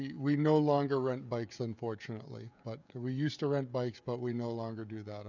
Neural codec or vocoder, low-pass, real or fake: codec, 16 kHz, 16 kbps, FreqCodec, smaller model; 7.2 kHz; fake